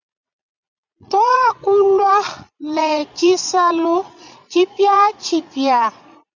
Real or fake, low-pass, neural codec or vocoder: fake; 7.2 kHz; vocoder, 22.05 kHz, 80 mel bands, Vocos